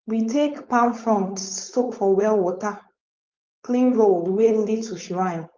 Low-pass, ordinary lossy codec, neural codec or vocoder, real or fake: 7.2 kHz; Opus, 24 kbps; codec, 16 kHz, 4.8 kbps, FACodec; fake